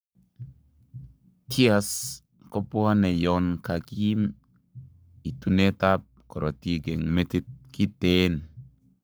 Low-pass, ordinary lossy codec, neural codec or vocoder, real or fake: none; none; codec, 44.1 kHz, 7.8 kbps, Pupu-Codec; fake